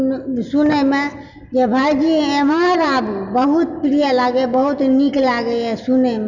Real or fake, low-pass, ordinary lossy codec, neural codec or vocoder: real; 7.2 kHz; none; none